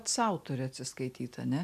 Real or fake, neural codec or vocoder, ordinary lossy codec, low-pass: real; none; AAC, 96 kbps; 14.4 kHz